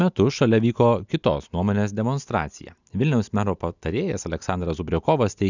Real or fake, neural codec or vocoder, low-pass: real; none; 7.2 kHz